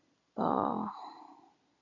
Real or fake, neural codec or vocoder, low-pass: real; none; 7.2 kHz